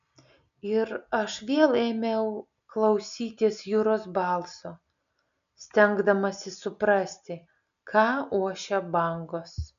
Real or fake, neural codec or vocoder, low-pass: real; none; 7.2 kHz